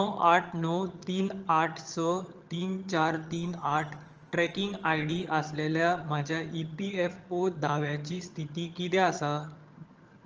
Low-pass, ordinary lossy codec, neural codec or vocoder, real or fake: 7.2 kHz; Opus, 24 kbps; vocoder, 22.05 kHz, 80 mel bands, HiFi-GAN; fake